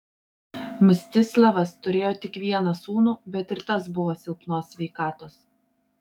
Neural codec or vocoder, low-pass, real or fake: codec, 44.1 kHz, 7.8 kbps, DAC; 19.8 kHz; fake